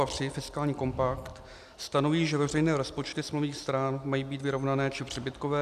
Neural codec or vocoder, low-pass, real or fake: none; 14.4 kHz; real